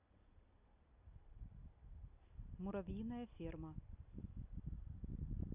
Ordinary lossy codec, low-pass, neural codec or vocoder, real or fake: none; 3.6 kHz; none; real